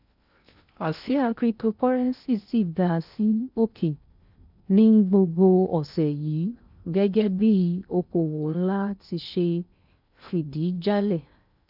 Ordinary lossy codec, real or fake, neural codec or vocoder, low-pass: none; fake; codec, 16 kHz in and 24 kHz out, 0.6 kbps, FocalCodec, streaming, 2048 codes; 5.4 kHz